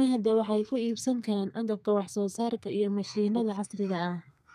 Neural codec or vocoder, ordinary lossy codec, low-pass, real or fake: codec, 32 kHz, 1.9 kbps, SNAC; none; 14.4 kHz; fake